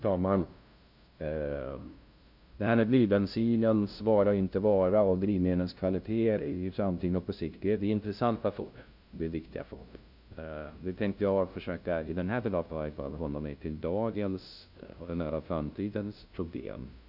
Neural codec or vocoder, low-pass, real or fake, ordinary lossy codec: codec, 16 kHz, 0.5 kbps, FunCodec, trained on LibriTTS, 25 frames a second; 5.4 kHz; fake; none